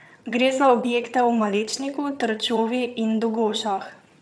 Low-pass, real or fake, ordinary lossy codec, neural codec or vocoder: none; fake; none; vocoder, 22.05 kHz, 80 mel bands, HiFi-GAN